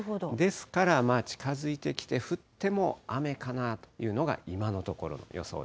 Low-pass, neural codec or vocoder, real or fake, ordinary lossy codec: none; none; real; none